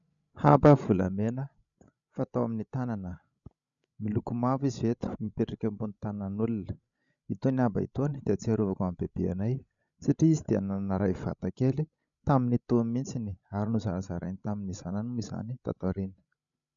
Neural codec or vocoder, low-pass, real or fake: codec, 16 kHz, 16 kbps, FreqCodec, larger model; 7.2 kHz; fake